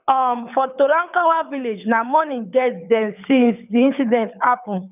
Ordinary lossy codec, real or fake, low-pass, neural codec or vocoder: none; fake; 3.6 kHz; codec, 24 kHz, 6 kbps, HILCodec